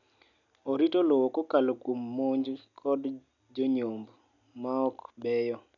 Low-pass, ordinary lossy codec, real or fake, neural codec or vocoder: 7.2 kHz; none; real; none